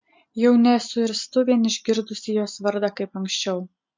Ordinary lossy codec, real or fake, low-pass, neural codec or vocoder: MP3, 48 kbps; real; 7.2 kHz; none